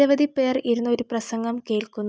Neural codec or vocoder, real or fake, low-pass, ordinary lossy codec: none; real; none; none